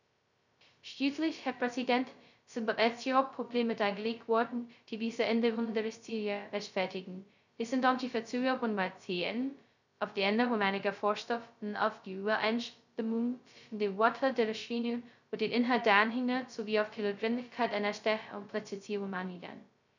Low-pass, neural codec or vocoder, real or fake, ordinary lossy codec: 7.2 kHz; codec, 16 kHz, 0.2 kbps, FocalCodec; fake; none